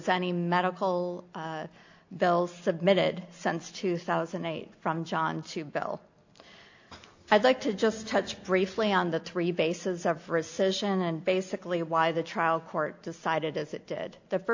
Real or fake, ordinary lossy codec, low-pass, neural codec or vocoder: real; AAC, 48 kbps; 7.2 kHz; none